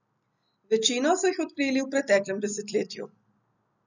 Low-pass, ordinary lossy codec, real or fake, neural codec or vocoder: 7.2 kHz; none; real; none